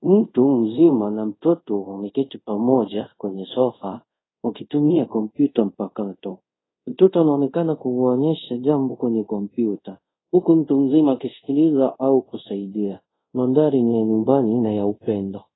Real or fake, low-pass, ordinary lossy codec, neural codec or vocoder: fake; 7.2 kHz; AAC, 16 kbps; codec, 24 kHz, 0.5 kbps, DualCodec